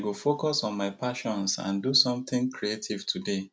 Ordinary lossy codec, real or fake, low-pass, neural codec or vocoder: none; real; none; none